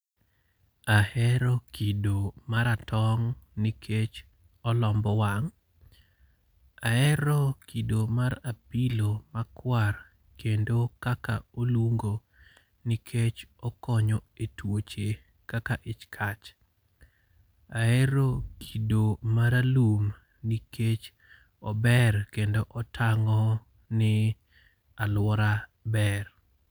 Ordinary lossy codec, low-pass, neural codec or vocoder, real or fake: none; none; none; real